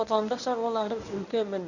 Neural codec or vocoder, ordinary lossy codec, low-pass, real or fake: codec, 24 kHz, 0.9 kbps, WavTokenizer, medium speech release version 1; none; 7.2 kHz; fake